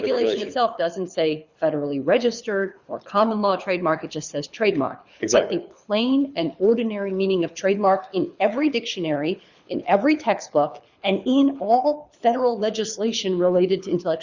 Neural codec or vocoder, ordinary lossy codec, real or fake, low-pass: codec, 24 kHz, 6 kbps, HILCodec; Opus, 64 kbps; fake; 7.2 kHz